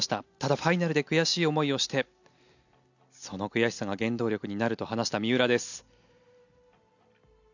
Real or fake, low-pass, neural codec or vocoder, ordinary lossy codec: real; 7.2 kHz; none; none